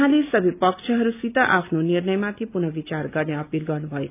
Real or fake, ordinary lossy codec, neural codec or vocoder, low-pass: real; none; none; 3.6 kHz